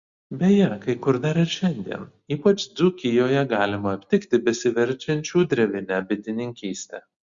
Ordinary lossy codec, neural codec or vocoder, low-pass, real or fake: Opus, 64 kbps; none; 7.2 kHz; real